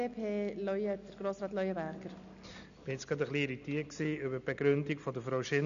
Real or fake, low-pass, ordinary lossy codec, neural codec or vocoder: real; 7.2 kHz; none; none